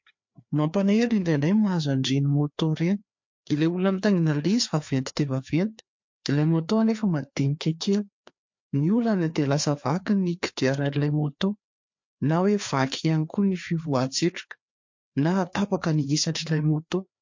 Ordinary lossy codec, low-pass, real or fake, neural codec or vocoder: MP3, 48 kbps; 7.2 kHz; fake; codec, 16 kHz, 2 kbps, FreqCodec, larger model